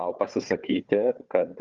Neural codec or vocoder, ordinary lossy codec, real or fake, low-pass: codec, 16 kHz, 4 kbps, FunCodec, trained on Chinese and English, 50 frames a second; Opus, 32 kbps; fake; 7.2 kHz